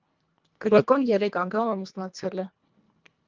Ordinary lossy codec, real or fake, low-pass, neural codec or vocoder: Opus, 24 kbps; fake; 7.2 kHz; codec, 24 kHz, 1.5 kbps, HILCodec